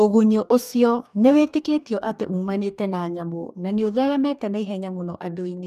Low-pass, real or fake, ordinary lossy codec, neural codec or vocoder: 14.4 kHz; fake; none; codec, 44.1 kHz, 2.6 kbps, DAC